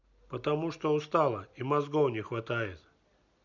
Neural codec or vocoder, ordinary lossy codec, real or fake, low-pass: none; none; real; 7.2 kHz